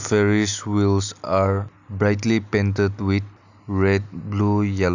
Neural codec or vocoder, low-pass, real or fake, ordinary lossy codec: none; 7.2 kHz; real; none